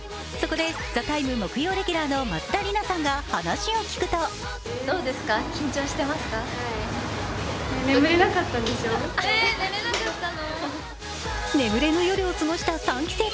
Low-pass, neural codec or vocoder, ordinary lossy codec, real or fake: none; none; none; real